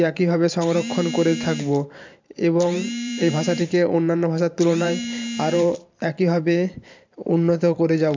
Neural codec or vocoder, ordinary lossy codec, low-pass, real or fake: vocoder, 44.1 kHz, 128 mel bands every 512 samples, BigVGAN v2; MP3, 64 kbps; 7.2 kHz; fake